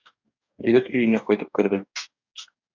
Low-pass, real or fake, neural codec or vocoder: 7.2 kHz; fake; codec, 44.1 kHz, 2.6 kbps, DAC